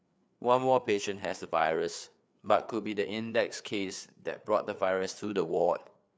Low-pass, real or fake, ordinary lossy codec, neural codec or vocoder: none; fake; none; codec, 16 kHz, 4 kbps, FreqCodec, larger model